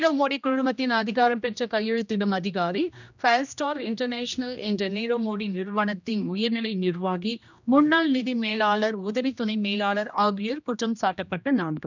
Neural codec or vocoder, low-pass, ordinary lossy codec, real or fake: codec, 16 kHz, 1 kbps, X-Codec, HuBERT features, trained on general audio; 7.2 kHz; none; fake